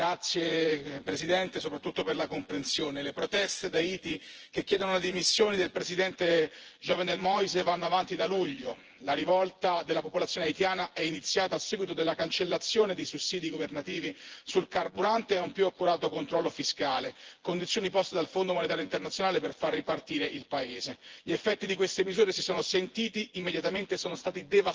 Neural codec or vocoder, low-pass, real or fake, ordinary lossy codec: vocoder, 24 kHz, 100 mel bands, Vocos; 7.2 kHz; fake; Opus, 16 kbps